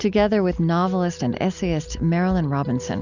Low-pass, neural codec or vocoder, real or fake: 7.2 kHz; none; real